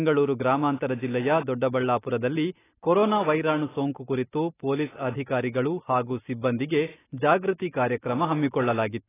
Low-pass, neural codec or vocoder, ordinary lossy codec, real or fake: 3.6 kHz; none; AAC, 16 kbps; real